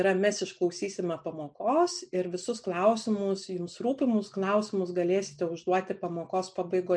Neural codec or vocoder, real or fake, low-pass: none; real; 9.9 kHz